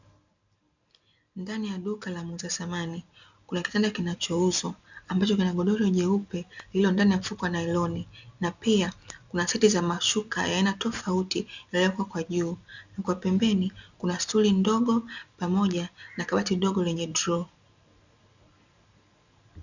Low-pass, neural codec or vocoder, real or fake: 7.2 kHz; none; real